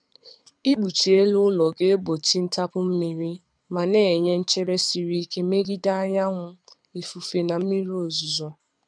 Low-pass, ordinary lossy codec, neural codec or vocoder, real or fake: 9.9 kHz; none; codec, 24 kHz, 6 kbps, HILCodec; fake